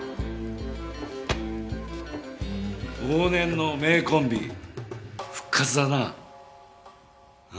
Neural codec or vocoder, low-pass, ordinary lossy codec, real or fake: none; none; none; real